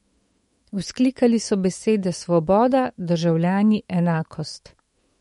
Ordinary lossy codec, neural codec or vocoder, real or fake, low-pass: MP3, 48 kbps; autoencoder, 48 kHz, 128 numbers a frame, DAC-VAE, trained on Japanese speech; fake; 19.8 kHz